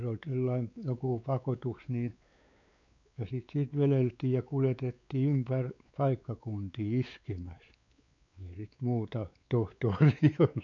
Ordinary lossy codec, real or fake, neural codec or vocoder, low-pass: none; fake; codec, 16 kHz, 4 kbps, X-Codec, WavLM features, trained on Multilingual LibriSpeech; 7.2 kHz